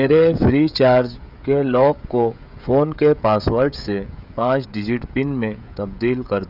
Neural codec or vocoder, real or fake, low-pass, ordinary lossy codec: codec, 16 kHz, 16 kbps, FreqCodec, smaller model; fake; 5.4 kHz; none